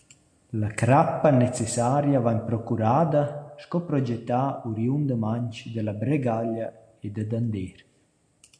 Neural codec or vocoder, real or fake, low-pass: none; real; 9.9 kHz